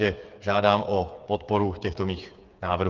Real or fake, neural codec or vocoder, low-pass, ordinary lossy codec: fake; codec, 16 kHz, 8 kbps, FreqCodec, smaller model; 7.2 kHz; Opus, 24 kbps